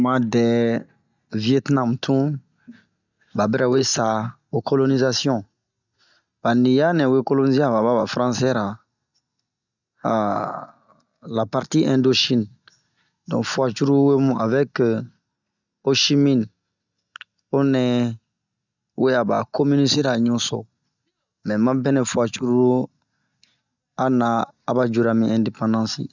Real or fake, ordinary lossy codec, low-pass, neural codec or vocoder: real; none; 7.2 kHz; none